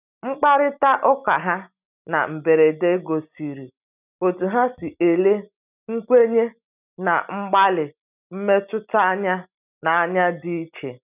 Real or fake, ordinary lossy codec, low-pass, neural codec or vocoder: real; none; 3.6 kHz; none